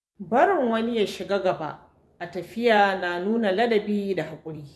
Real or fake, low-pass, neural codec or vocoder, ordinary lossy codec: fake; none; vocoder, 24 kHz, 100 mel bands, Vocos; none